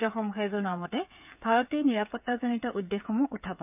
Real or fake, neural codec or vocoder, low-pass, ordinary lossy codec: fake; codec, 16 kHz, 8 kbps, FreqCodec, smaller model; 3.6 kHz; none